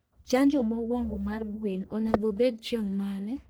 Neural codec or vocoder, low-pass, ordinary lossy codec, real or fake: codec, 44.1 kHz, 1.7 kbps, Pupu-Codec; none; none; fake